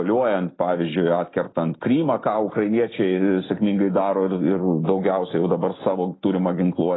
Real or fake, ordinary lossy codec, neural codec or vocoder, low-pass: real; AAC, 16 kbps; none; 7.2 kHz